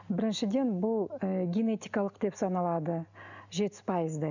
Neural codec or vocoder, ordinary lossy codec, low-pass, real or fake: none; none; 7.2 kHz; real